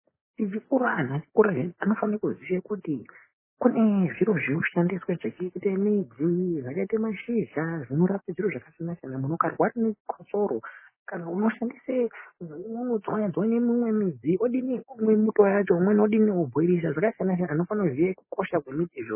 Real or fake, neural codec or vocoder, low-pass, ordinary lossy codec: real; none; 3.6 kHz; MP3, 16 kbps